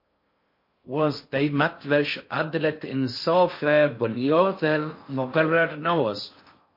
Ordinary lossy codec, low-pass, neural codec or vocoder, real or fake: MP3, 32 kbps; 5.4 kHz; codec, 16 kHz in and 24 kHz out, 0.6 kbps, FocalCodec, streaming, 4096 codes; fake